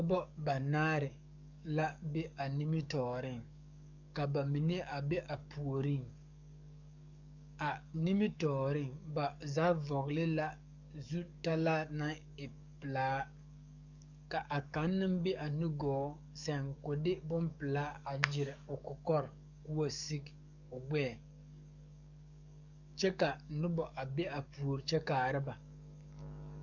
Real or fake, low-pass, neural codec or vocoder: fake; 7.2 kHz; codec, 44.1 kHz, 7.8 kbps, DAC